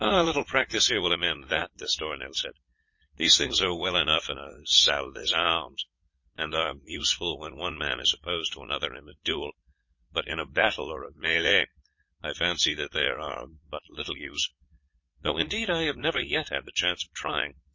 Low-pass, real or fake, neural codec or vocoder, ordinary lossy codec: 7.2 kHz; fake; vocoder, 22.05 kHz, 80 mel bands, Vocos; MP3, 32 kbps